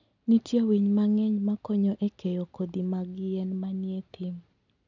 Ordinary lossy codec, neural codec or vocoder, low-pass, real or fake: none; none; 7.2 kHz; real